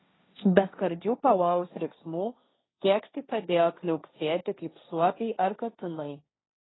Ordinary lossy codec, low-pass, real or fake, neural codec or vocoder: AAC, 16 kbps; 7.2 kHz; fake; codec, 16 kHz, 1.1 kbps, Voila-Tokenizer